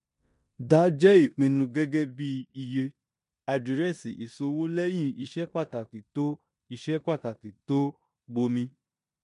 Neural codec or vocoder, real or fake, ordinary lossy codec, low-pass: codec, 16 kHz in and 24 kHz out, 0.9 kbps, LongCat-Audio-Codec, four codebook decoder; fake; MP3, 64 kbps; 10.8 kHz